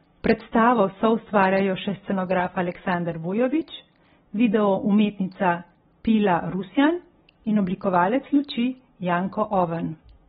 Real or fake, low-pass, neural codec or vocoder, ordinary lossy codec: real; 10.8 kHz; none; AAC, 16 kbps